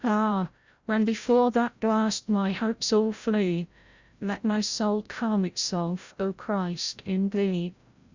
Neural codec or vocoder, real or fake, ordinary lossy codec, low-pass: codec, 16 kHz, 0.5 kbps, FreqCodec, larger model; fake; Opus, 64 kbps; 7.2 kHz